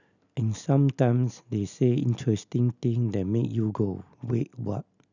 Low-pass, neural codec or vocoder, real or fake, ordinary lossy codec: 7.2 kHz; none; real; none